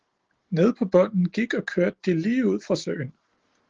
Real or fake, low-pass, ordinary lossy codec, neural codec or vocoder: real; 7.2 kHz; Opus, 16 kbps; none